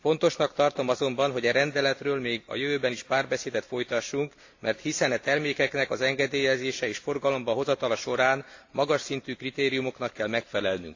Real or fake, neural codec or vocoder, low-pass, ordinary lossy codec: real; none; 7.2 kHz; AAC, 48 kbps